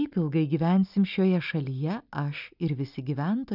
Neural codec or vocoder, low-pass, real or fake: none; 5.4 kHz; real